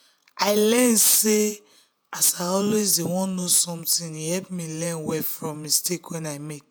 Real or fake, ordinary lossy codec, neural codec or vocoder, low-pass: fake; none; vocoder, 48 kHz, 128 mel bands, Vocos; none